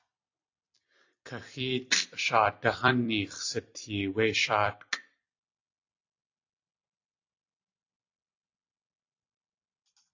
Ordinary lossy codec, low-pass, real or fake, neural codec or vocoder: AAC, 48 kbps; 7.2 kHz; fake; vocoder, 44.1 kHz, 128 mel bands every 256 samples, BigVGAN v2